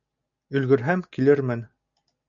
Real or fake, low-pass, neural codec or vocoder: real; 7.2 kHz; none